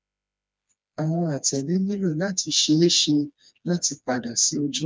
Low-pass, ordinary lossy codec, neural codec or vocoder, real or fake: none; none; codec, 16 kHz, 2 kbps, FreqCodec, smaller model; fake